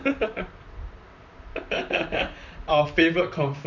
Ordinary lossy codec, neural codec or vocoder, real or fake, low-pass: none; vocoder, 44.1 kHz, 128 mel bands, Pupu-Vocoder; fake; 7.2 kHz